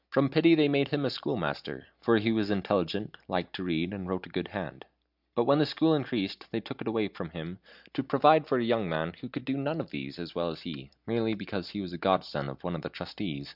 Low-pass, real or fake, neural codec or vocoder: 5.4 kHz; real; none